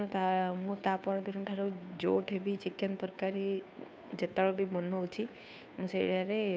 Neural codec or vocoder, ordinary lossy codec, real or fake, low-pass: codec, 16 kHz, 2 kbps, FunCodec, trained on Chinese and English, 25 frames a second; none; fake; none